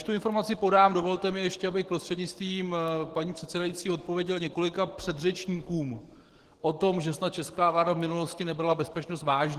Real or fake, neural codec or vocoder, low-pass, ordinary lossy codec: fake; codec, 44.1 kHz, 7.8 kbps, DAC; 14.4 kHz; Opus, 16 kbps